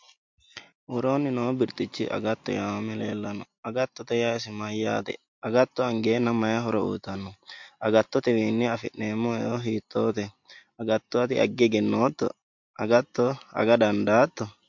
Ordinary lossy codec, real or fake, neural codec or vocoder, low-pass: MP3, 48 kbps; real; none; 7.2 kHz